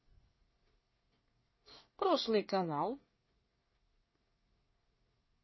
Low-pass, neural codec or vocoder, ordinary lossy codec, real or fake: 7.2 kHz; codec, 24 kHz, 1 kbps, SNAC; MP3, 24 kbps; fake